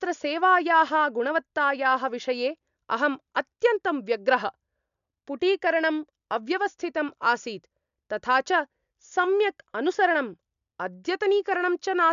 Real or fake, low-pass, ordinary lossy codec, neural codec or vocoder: real; 7.2 kHz; MP3, 96 kbps; none